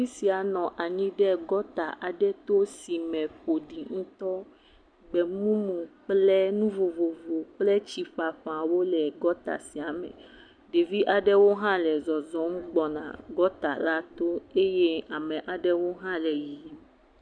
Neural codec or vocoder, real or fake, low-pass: none; real; 9.9 kHz